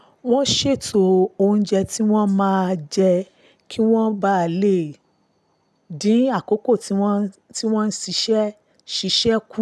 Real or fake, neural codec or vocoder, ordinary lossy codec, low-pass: real; none; none; none